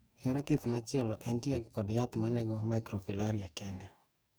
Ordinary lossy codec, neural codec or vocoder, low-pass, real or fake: none; codec, 44.1 kHz, 2.6 kbps, DAC; none; fake